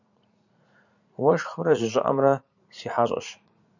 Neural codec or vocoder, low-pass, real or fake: vocoder, 44.1 kHz, 80 mel bands, Vocos; 7.2 kHz; fake